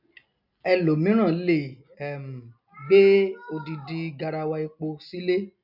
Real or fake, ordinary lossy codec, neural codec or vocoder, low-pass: real; none; none; 5.4 kHz